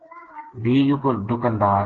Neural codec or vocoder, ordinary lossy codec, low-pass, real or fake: codec, 16 kHz, 4 kbps, FreqCodec, smaller model; Opus, 16 kbps; 7.2 kHz; fake